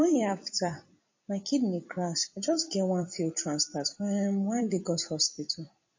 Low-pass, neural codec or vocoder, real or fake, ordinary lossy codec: 7.2 kHz; vocoder, 44.1 kHz, 128 mel bands every 256 samples, BigVGAN v2; fake; MP3, 32 kbps